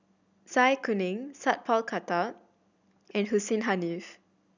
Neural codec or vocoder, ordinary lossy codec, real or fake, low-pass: none; none; real; 7.2 kHz